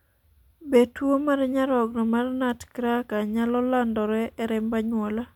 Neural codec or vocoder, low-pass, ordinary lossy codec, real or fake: none; 19.8 kHz; MP3, 96 kbps; real